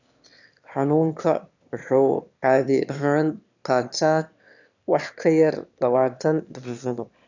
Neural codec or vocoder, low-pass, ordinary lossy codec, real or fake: autoencoder, 22.05 kHz, a latent of 192 numbers a frame, VITS, trained on one speaker; 7.2 kHz; none; fake